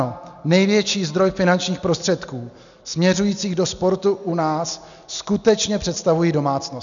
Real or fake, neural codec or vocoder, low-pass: real; none; 7.2 kHz